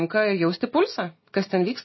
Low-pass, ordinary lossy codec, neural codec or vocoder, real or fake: 7.2 kHz; MP3, 24 kbps; none; real